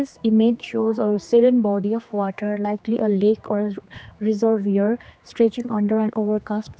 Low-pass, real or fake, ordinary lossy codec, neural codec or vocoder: none; fake; none; codec, 16 kHz, 2 kbps, X-Codec, HuBERT features, trained on general audio